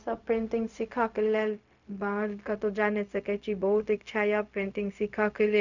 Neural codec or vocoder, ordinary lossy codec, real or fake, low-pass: codec, 16 kHz, 0.4 kbps, LongCat-Audio-Codec; none; fake; 7.2 kHz